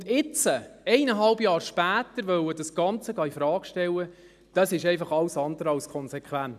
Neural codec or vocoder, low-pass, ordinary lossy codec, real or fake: none; 14.4 kHz; none; real